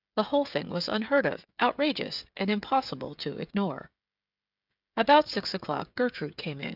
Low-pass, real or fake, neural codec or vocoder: 5.4 kHz; fake; codec, 16 kHz, 16 kbps, FreqCodec, smaller model